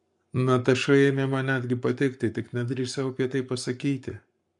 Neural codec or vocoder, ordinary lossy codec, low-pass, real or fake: codec, 44.1 kHz, 7.8 kbps, Pupu-Codec; MP3, 64 kbps; 10.8 kHz; fake